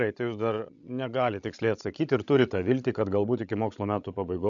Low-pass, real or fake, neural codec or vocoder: 7.2 kHz; fake; codec, 16 kHz, 16 kbps, FreqCodec, larger model